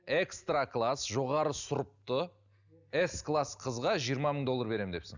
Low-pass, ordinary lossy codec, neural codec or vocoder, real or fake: 7.2 kHz; none; none; real